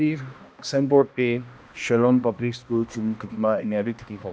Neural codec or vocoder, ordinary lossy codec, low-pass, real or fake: codec, 16 kHz, 1 kbps, X-Codec, HuBERT features, trained on balanced general audio; none; none; fake